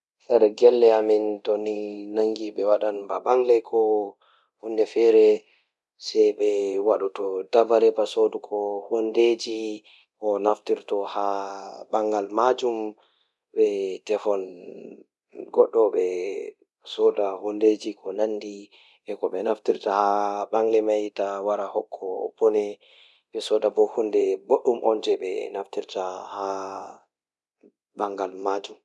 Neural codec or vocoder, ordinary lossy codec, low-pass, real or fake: codec, 24 kHz, 0.9 kbps, DualCodec; none; none; fake